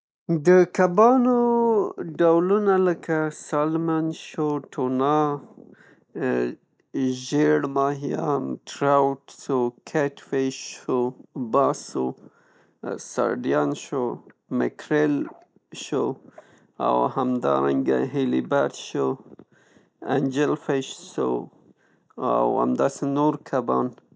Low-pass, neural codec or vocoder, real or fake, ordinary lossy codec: none; none; real; none